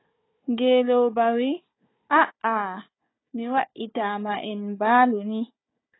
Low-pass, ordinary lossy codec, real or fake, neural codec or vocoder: 7.2 kHz; AAC, 16 kbps; fake; codec, 16 kHz, 4 kbps, FunCodec, trained on Chinese and English, 50 frames a second